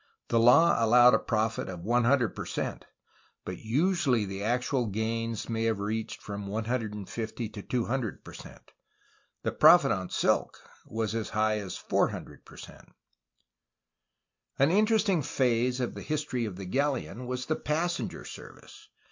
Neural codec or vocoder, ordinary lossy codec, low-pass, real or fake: none; MP3, 48 kbps; 7.2 kHz; real